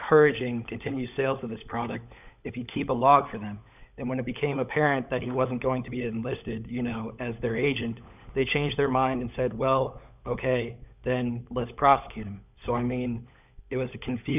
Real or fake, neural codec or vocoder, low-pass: fake; codec, 16 kHz, 16 kbps, FunCodec, trained on LibriTTS, 50 frames a second; 3.6 kHz